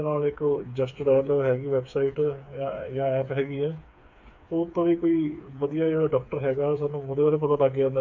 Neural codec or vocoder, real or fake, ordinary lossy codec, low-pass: codec, 16 kHz, 4 kbps, FreqCodec, smaller model; fake; MP3, 48 kbps; 7.2 kHz